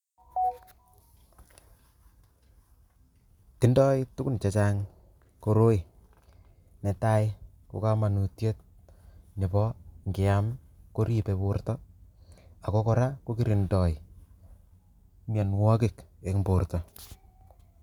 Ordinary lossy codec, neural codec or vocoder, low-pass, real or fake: none; none; 19.8 kHz; real